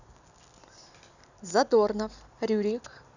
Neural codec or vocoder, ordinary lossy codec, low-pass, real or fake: none; none; 7.2 kHz; real